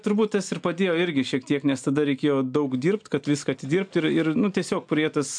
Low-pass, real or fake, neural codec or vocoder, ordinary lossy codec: 9.9 kHz; real; none; MP3, 64 kbps